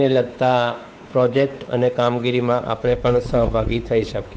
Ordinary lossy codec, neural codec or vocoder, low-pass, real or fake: none; codec, 16 kHz, 4 kbps, X-Codec, WavLM features, trained on Multilingual LibriSpeech; none; fake